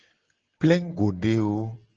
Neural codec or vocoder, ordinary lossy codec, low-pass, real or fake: none; Opus, 16 kbps; 7.2 kHz; real